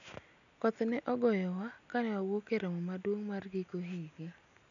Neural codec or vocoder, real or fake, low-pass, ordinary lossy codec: none; real; 7.2 kHz; none